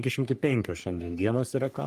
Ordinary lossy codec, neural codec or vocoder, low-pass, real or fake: Opus, 32 kbps; codec, 44.1 kHz, 3.4 kbps, Pupu-Codec; 14.4 kHz; fake